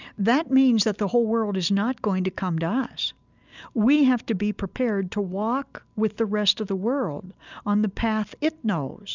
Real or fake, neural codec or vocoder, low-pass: real; none; 7.2 kHz